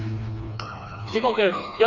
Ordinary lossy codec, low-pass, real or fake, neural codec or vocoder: none; 7.2 kHz; fake; codec, 16 kHz, 2 kbps, FreqCodec, smaller model